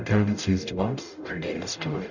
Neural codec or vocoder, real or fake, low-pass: codec, 44.1 kHz, 0.9 kbps, DAC; fake; 7.2 kHz